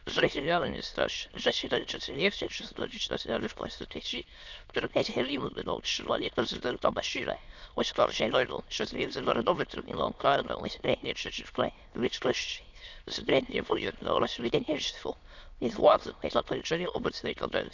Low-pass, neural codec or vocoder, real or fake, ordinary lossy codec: 7.2 kHz; autoencoder, 22.05 kHz, a latent of 192 numbers a frame, VITS, trained on many speakers; fake; none